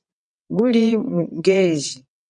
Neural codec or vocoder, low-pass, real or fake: vocoder, 22.05 kHz, 80 mel bands, WaveNeXt; 9.9 kHz; fake